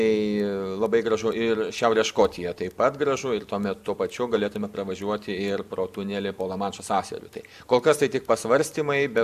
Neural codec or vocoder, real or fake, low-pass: none; real; 14.4 kHz